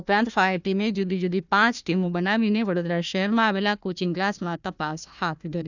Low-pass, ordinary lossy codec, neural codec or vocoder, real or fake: 7.2 kHz; none; codec, 16 kHz, 1 kbps, FunCodec, trained on Chinese and English, 50 frames a second; fake